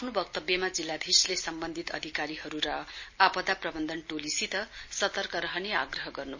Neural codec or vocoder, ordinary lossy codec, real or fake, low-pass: none; MP3, 32 kbps; real; 7.2 kHz